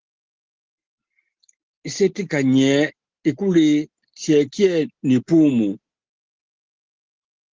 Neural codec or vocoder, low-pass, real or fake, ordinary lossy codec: none; 7.2 kHz; real; Opus, 16 kbps